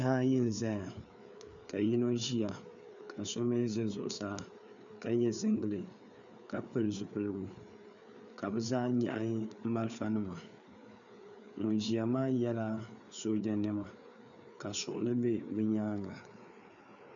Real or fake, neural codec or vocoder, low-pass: fake; codec, 16 kHz, 4 kbps, FreqCodec, larger model; 7.2 kHz